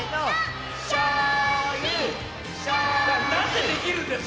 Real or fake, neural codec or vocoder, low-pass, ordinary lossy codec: real; none; none; none